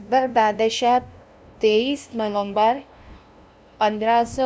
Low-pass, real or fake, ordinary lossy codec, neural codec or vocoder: none; fake; none; codec, 16 kHz, 0.5 kbps, FunCodec, trained on LibriTTS, 25 frames a second